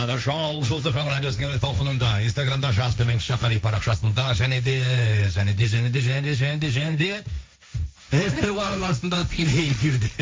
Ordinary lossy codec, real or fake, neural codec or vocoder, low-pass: none; fake; codec, 16 kHz, 1.1 kbps, Voila-Tokenizer; none